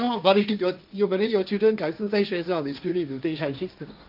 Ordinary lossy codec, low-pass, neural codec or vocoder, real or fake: none; 5.4 kHz; codec, 16 kHz, 1.1 kbps, Voila-Tokenizer; fake